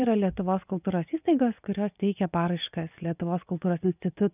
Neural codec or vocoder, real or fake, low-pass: vocoder, 24 kHz, 100 mel bands, Vocos; fake; 3.6 kHz